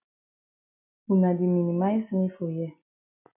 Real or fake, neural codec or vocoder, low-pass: real; none; 3.6 kHz